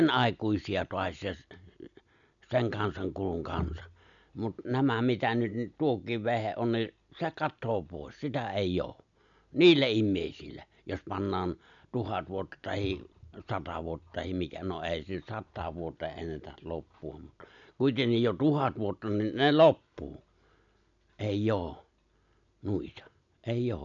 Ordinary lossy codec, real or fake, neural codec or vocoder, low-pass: none; real; none; 7.2 kHz